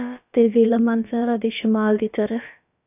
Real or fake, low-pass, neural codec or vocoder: fake; 3.6 kHz; codec, 16 kHz, about 1 kbps, DyCAST, with the encoder's durations